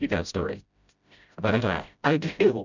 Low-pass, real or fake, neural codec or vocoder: 7.2 kHz; fake; codec, 16 kHz, 0.5 kbps, FreqCodec, smaller model